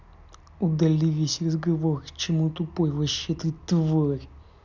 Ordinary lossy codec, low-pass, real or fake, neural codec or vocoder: none; 7.2 kHz; real; none